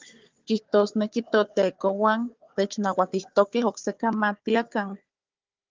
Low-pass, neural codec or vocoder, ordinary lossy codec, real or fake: 7.2 kHz; codec, 16 kHz, 4 kbps, FunCodec, trained on Chinese and English, 50 frames a second; Opus, 32 kbps; fake